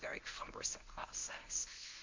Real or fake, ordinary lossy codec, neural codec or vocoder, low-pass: fake; MP3, 64 kbps; codec, 24 kHz, 0.9 kbps, WavTokenizer, medium speech release version 1; 7.2 kHz